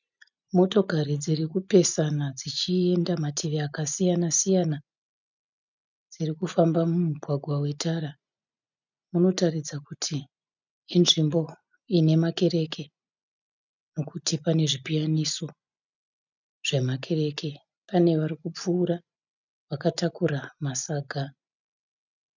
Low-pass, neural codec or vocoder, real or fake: 7.2 kHz; none; real